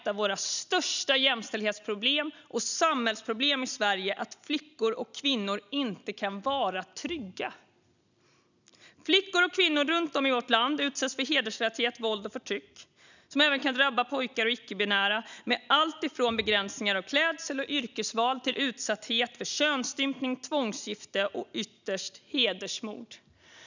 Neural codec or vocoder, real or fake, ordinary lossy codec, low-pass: none; real; none; 7.2 kHz